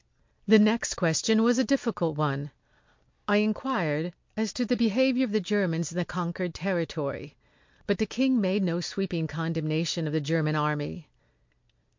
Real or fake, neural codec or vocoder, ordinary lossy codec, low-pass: real; none; MP3, 48 kbps; 7.2 kHz